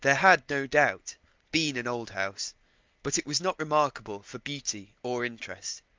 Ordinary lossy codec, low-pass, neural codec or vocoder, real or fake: Opus, 32 kbps; 7.2 kHz; none; real